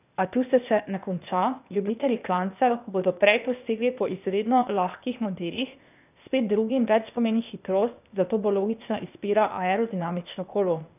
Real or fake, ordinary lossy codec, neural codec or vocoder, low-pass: fake; none; codec, 16 kHz, 0.8 kbps, ZipCodec; 3.6 kHz